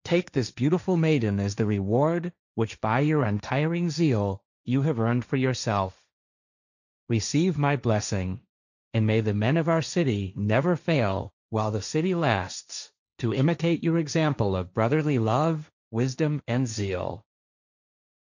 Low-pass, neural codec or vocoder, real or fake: 7.2 kHz; codec, 16 kHz, 1.1 kbps, Voila-Tokenizer; fake